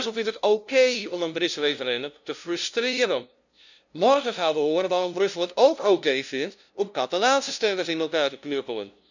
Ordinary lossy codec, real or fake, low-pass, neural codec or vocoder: none; fake; 7.2 kHz; codec, 16 kHz, 0.5 kbps, FunCodec, trained on LibriTTS, 25 frames a second